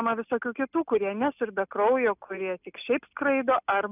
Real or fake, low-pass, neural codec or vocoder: real; 3.6 kHz; none